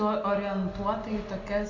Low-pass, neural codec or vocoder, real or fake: 7.2 kHz; none; real